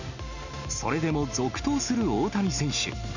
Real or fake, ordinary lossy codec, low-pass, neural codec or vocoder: real; none; 7.2 kHz; none